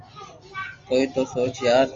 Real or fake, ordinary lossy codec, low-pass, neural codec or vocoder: real; Opus, 32 kbps; 7.2 kHz; none